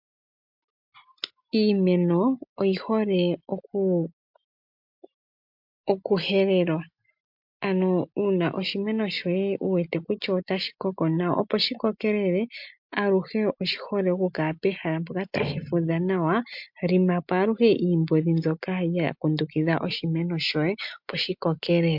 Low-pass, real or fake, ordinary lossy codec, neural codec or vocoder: 5.4 kHz; real; MP3, 48 kbps; none